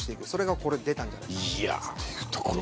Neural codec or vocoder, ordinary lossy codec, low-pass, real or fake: none; none; none; real